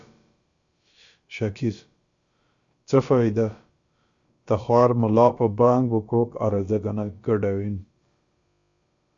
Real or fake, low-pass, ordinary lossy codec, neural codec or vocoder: fake; 7.2 kHz; Opus, 64 kbps; codec, 16 kHz, about 1 kbps, DyCAST, with the encoder's durations